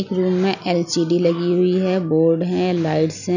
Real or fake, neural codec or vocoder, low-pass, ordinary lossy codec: real; none; 7.2 kHz; none